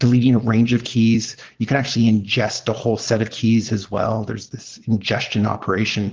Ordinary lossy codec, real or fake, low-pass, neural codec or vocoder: Opus, 16 kbps; fake; 7.2 kHz; vocoder, 22.05 kHz, 80 mel bands, Vocos